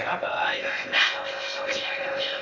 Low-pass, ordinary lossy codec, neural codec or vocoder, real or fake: 7.2 kHz; none; codec, 16 kHz in and 24 kHz out, 0.6 kbps, FocalCodec, streaming, 2048 codes; fake